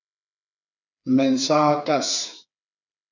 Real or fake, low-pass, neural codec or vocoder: fake; 7.2 kHz; codec, 16 kHz, 4 kbps, FreqCodec, smaller model